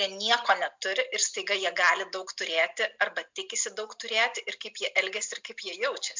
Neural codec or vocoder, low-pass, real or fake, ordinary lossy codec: none; 7.2 kHz; real; MP3, 64 kbps